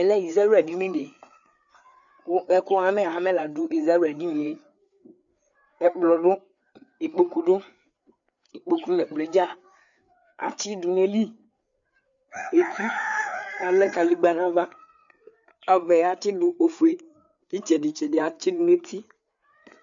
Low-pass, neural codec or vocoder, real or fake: 7.2 kHz; codec, 16 kHz, 4 kbps, FreqCodec, larger model; fake